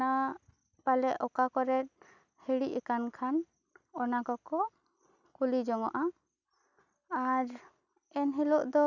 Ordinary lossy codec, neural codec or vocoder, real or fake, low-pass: none; none; real; 7.2 kHz